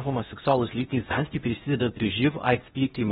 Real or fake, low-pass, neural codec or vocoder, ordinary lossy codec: fake; 10.8 kHz; codec, 16 kHz in and 24 kHz out, 0.6 kbps, FocalCodec, streaming, 4096 codes; AAC, 16 kbps